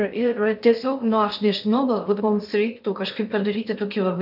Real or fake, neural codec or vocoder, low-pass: fake; codec, 16 kHz in and 24 kHz out, 0.6 kbps, FocalCodec, streaming, 2048 codes; 5.4 kHz